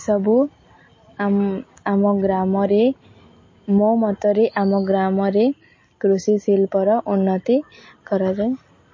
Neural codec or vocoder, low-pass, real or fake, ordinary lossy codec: none; 7.2 kHz; real; MP3, 32 kbps